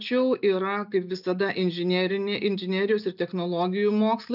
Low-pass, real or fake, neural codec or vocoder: 5.4 kHz; real; none